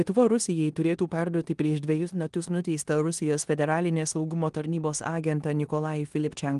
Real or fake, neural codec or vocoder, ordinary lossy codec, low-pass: fake; codec, 16 kHz in and 24 kHz out, 0.9 kbps, LongCat-Audio-Codec, four codebook decoder; Opus, 24 kbps; 10.8 kHz